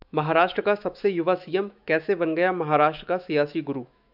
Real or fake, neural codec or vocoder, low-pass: fake; autoencoder, 48 kHz, 128 numbers a frame, DAC-VAE, trained on Japanese speech; 5.4 kHz